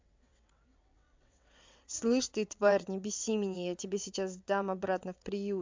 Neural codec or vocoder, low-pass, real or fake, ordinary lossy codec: vocoder, 22.05 kHz, 80 mel bands, WaveNeXt; 7.2 kHz; fake; MP3, 64 kbps